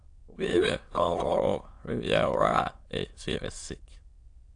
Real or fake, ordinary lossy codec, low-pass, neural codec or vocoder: fake; AAC, 48 kbps; 9.9 kHz; autoencoder, 22.05 kHz, a latent of 192 numbers a frame, VITS, trained on many speakers